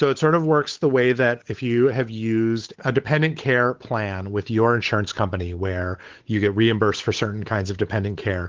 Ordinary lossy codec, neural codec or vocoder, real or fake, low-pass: Opus, 16 kbps; codec, 16 kHz, 8 kbps, FunCodec, trained on Chinese and English, 25 frames a second; fake; 7.2 kHz